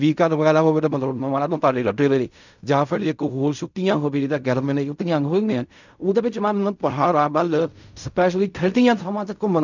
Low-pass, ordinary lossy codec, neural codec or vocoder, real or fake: 7.2 kHz; none; codec, 16 kHz in and 24 kHz out, 0.4 kbps, LongCat-Audio-Codec, fine tuned four codebook decoder; fake